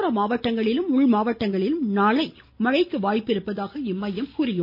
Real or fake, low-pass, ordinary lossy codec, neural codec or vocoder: real; 5.4 kHz; none; none